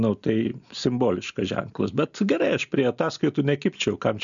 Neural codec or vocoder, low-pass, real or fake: none; 7.2 kHz; real